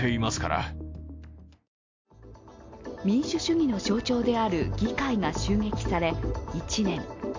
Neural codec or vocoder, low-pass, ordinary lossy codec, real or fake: none; 7.2 kHz; MP3, 48 kbps; real